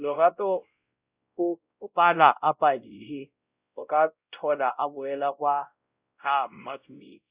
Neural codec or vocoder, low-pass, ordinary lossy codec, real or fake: codec, 16 kHz, 0.5 kbps, X-Codec, WavLM features, trained on Multilingual LibriSpeech; 3.6 kHz; Opus, 64 kbps; fake